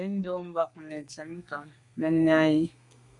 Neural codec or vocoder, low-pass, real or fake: codec, 32 kHz, 1.9 kbps, SNAC; 10.8 kHz; fake